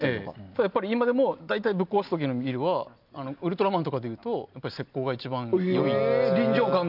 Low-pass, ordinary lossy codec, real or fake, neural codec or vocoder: 5.4 kHz; none; real; none